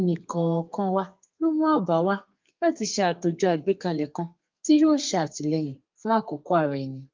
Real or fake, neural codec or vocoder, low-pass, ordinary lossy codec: fake; codec, 32 kHz, 1.9 kbps, SNAC; 7.2 kHz; Opus, 24 kbps